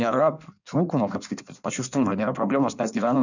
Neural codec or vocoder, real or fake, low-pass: codec, 16 kHz in and 24 kHz out, 1.1 kbps, FireRedTTS-2 codec; fake; 7.2 kHz